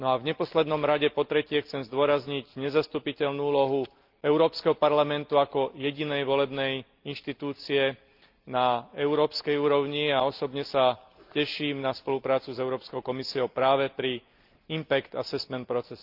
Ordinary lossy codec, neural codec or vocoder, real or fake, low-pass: Opus, 32 kbps; none; real; 5.4 kHz